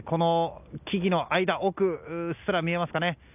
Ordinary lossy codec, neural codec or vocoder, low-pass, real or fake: none; none; 3.6 kHz; real